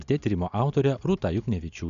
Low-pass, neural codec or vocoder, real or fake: 7.2 kHz; none; real